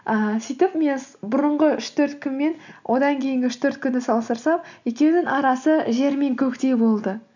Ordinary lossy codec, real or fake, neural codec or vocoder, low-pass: none; real; none; 7.2 kHz